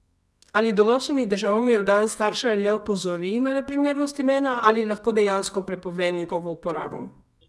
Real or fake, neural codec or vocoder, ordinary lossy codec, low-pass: fake; codec, 24 kHz, 0.9 kbps, WavTokenizer, medium music audio release; none; none